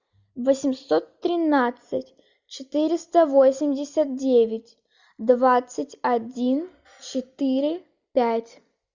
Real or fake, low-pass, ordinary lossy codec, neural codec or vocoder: real; 7.2 kHz; Opus, 64 kbps; none